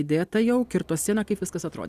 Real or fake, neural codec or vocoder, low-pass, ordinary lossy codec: real; none; 14.4 kHz; Opus, 64 kbps